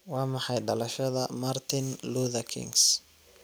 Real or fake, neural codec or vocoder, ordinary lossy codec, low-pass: real; none; none; none